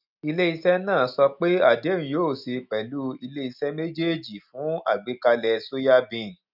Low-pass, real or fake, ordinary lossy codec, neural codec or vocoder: 5.4 kHz; real; none; none